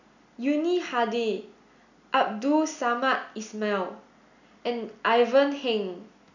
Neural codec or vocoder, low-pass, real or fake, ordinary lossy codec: none; 7.2 kHz; real; none